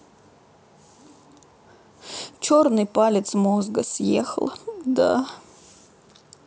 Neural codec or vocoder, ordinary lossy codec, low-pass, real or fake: none; none; none; real